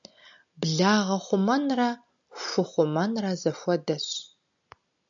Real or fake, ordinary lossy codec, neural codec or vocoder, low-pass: real; AAC, 64 kbps; none; 7.2 kHz